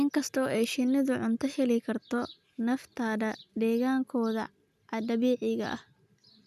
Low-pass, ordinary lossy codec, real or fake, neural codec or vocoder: 14.4 kHz; none; real; none